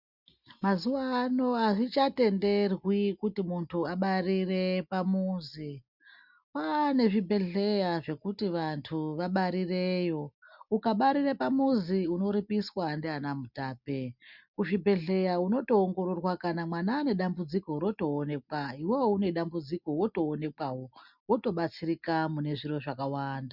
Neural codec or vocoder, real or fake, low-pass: none; real; 5.4 kHz